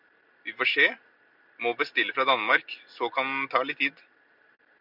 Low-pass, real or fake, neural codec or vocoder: 5.4 kHz; real; none